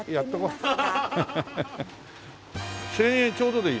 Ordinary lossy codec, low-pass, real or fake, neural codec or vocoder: none; none; real; none